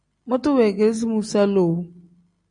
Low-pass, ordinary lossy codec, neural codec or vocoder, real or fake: 9.9 kHz; AAC, 64 kbps; none; real